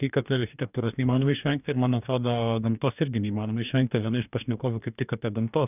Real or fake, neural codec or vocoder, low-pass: fake; codec, 44.1 kHz, 2.6 kbps, DAC; 3.6 kHz